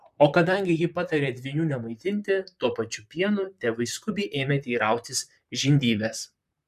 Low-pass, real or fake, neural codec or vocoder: 14.4 kHz; fake; vocoder, 44.1 kHz, 128 mel bands, Pupu-Vocoder